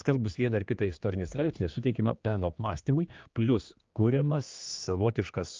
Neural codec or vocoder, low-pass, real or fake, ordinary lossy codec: codec, 16 kHz, 2 kbps, X-Codec, HuBERT features, trained on general audio; 7.2 kHz; fake; Opus, 24 kbps